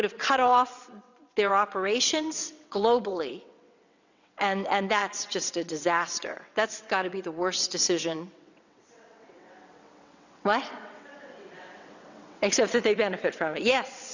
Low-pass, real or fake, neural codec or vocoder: 7.2 kHz; fake; vocoder, 22.05 kHz, 80 mel bands, WaveNeXt